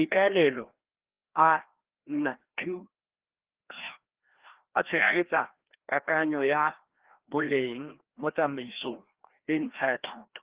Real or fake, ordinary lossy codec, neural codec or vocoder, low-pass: fake; Opus, 16 kbps; codec, 16 kHz, 1 kbps, FreqCodec, larger model; 3.6 kHz